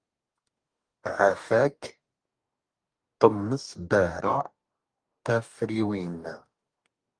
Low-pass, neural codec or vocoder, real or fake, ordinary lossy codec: 9.9 kHz; codec, 44.1 kHz, 2.6 kbps, DAC; fake; Opus, 32 kbps